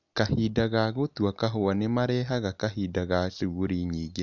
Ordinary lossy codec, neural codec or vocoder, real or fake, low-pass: none; none; real; 7.2 kHz